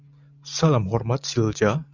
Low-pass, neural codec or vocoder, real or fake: 7.2 kHz; none; real